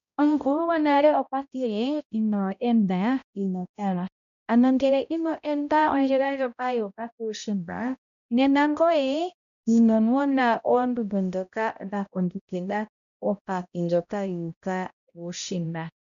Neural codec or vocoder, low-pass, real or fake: codec, 16 kHz, 0.5 kbps, X-Codec, HuBERT features, trained on balanced general audio; 7.2 kHz; fake